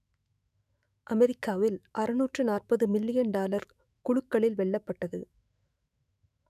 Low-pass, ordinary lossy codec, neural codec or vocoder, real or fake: 14.4 kHz; none; autoencoder, 48 kHz, 128 numbers a frame, DAC-VAE, trained on Japanese speech; fake